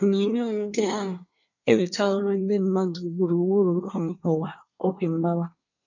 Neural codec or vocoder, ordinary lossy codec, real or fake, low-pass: codec, 24 kHz, 1 kbps, SNAC; none; fake; 7.2 kHz